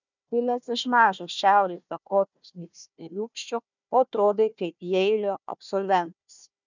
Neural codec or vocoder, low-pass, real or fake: codec, 16 kHz, 1 kbps, FunCodec, trained on Chinese and English, 50 frames a second; 7.2 kHz; fake